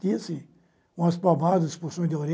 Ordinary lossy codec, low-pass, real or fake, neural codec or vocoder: none; none; real; none